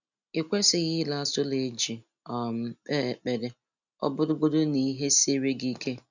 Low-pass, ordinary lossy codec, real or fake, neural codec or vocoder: 7.2 kHz; none; real; none